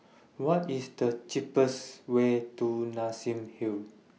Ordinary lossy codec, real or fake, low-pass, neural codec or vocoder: none; real; none; none